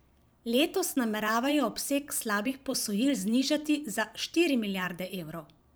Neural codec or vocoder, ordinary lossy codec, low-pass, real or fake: vocoder, 44.1 kHz, 128 mel bands every 512 samples, BigVGAN v2; none; none; fake